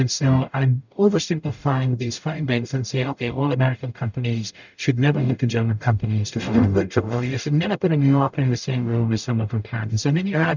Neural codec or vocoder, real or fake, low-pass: codec, 44.1 kHz, 0.9 kbps, DAC; fake; 7.2 kHz